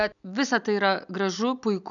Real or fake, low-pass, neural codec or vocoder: real; 7.2 kHz; none